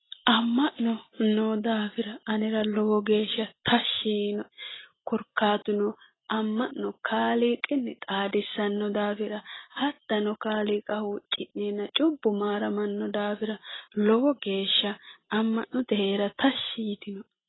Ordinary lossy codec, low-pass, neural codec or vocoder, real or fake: AAC, 16 kbps; 7.2 kHz; none; real